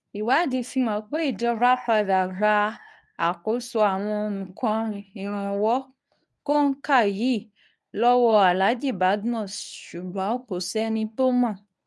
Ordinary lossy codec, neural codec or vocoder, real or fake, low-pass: none; codec, 24 kHz, 0.9 kbps, WavTokenizer, medium speech release version 1; fake; none